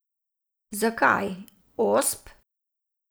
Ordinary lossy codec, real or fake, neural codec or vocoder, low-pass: none; real; none; none